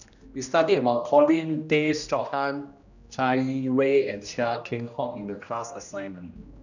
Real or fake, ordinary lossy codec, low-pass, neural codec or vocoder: fake; none; 7.2 kHz; codec, 16 kHz, 1 kbps, X-Codec, HuBERT features, trained on general audio